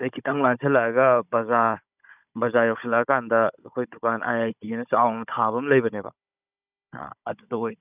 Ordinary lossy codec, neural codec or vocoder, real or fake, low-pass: none; codec, 16 kHz, 16 kbps, FunCodec, trained on Chinese and English, 50 frames a second; fake; 3.6 kHz